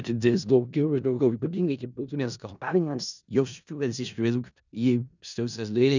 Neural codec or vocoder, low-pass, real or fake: codec, 16 kHz in and 24 kHz out, 0.4 kbps, LongCat-Audio-Codec, four codebook decoder; 7.2 kHz; fake